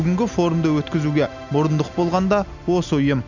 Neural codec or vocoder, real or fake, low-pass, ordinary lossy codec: none; real; 7.2 kHz; none